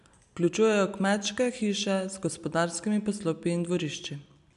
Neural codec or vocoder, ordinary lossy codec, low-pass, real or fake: none; none; 10.8 kHz; real